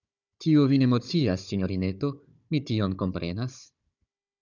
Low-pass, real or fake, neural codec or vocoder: 7.2 kHz; fake; codec, 16 kHz, 16 kbps, FunCodec, trained on Chinese and English, 50 frames a second